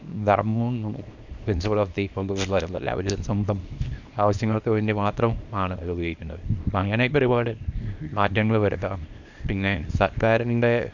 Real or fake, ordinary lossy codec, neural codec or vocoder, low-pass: fake; none; codec, 24 kHz, 0.9 kbps, WavTokenizer, small release; 7.2 kHz